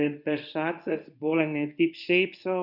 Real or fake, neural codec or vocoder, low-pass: fake; codec, 24 kHz, 0.9 kbps, WavTokenizer, medium speech release version 2; 5.4 kHz